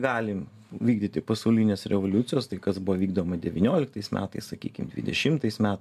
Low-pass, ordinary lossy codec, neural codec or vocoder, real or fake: 14.4 kHz; MP3, 96 kbps; none; real